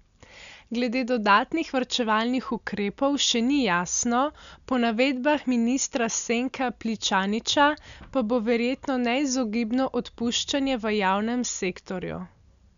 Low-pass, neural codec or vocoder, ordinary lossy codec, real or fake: 7.2 kHz; none; none; real